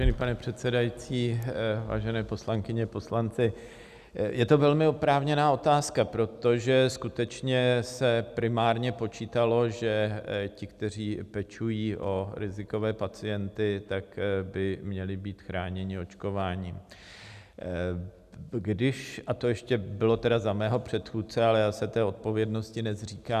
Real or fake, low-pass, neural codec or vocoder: real; 14.4 kHz; none